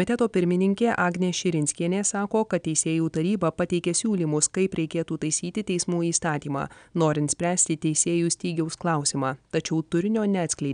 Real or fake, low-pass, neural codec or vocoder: real; 9.9 kHz; none